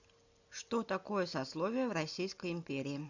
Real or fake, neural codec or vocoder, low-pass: real; none; 7.2 kHz